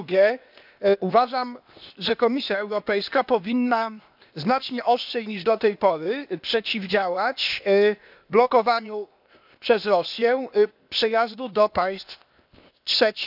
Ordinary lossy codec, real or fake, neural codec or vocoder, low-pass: none; fake; codec, 16 kHz, 0.8 kbps, ZipCodec; 5.4 kHz